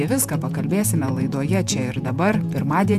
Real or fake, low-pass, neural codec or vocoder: fake; 14.4 kHz; vocoder, 48 kHz, 128 mel bands, Vocos